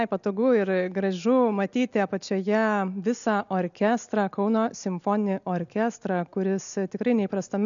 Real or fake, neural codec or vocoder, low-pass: real; none; 7.2 kHz